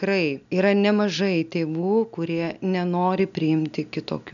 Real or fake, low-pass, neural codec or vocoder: real; 7.2 kHz; none